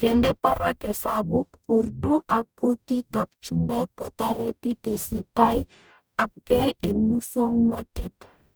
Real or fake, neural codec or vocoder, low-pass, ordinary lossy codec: fake; codec, 44.1 kHz, 0.9 kbps, DAC; none; none